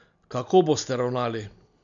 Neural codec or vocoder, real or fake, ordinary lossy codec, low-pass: none; real; none; 7.2 kHz